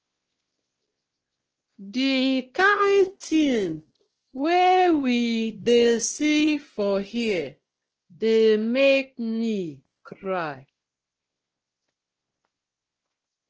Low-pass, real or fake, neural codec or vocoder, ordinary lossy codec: 7.2 kHz; fake; codec, 16 kHz, 1 kbps, X-Codec, WavLM features, trained on Multilingual LibriSpeech; Opus, 16 kbps